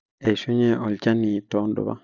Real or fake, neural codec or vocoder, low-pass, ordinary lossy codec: real; none; 7.2 kHz; none